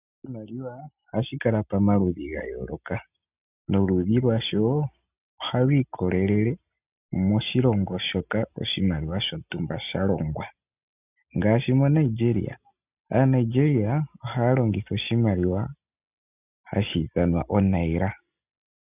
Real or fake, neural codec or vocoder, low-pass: real; none; 3.6 kHz